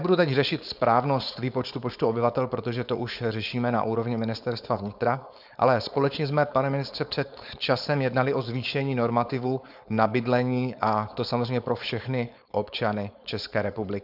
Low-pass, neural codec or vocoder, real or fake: 5.4 kHz; codec, 16 kHz, 4.8 kbps, FACodec; fake